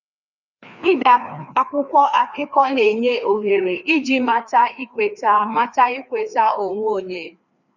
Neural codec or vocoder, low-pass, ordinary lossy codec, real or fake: codec, 16 kHz, 2 kbps, FreqCodec, larger model; 7.2 kHz; none; fake